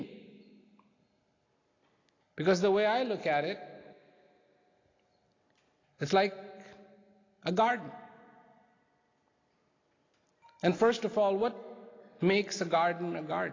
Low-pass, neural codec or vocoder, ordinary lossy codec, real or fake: 7.2 kHz; none; AAC, 32 kbps; real